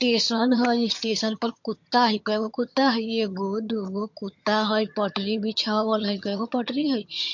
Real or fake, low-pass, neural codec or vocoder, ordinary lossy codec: fake; 7.2 kHz; vocoder, 22.05 kHz, 80 mel bands, HiFi-GAN; MP3, 48 kbps